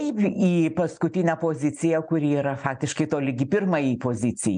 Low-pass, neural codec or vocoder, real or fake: 9.9 kHz; none; real